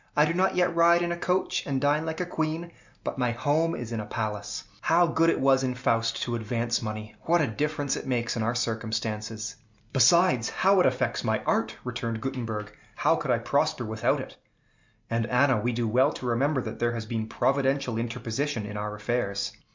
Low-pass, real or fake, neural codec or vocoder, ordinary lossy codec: 7.2 kHz; real; none; MP3, 64 kbps